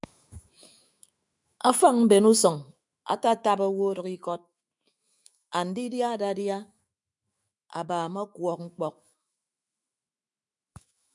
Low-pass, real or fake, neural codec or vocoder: 10.8 kHz; fake; autoencoder, 48 kHz, 128 numbers a frame, DAC-VAE, trained on Japanese speech